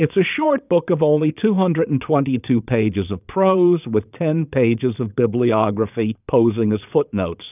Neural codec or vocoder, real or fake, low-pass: vocoder, 22.05 kHz, 80 mel bands, Vocos; fake; 3.6 kHz